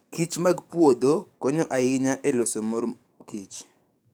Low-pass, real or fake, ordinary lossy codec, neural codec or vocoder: none; fake; none; codec, 44.1 kHz, 7.8 kbps, DAC